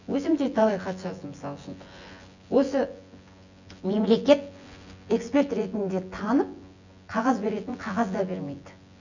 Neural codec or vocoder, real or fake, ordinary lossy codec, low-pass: vocoder, 24 kHz, 100 mel bands, Vocos; fake; none; 7.2 kHz